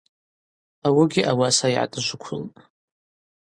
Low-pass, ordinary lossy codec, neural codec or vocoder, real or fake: 9.9 kHz; Opus, 64 kbps; none; real